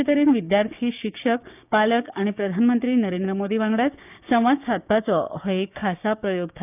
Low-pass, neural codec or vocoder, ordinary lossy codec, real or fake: 3.6 kHz; codec, 16 kHz, 6 kbps, DAC; none; fake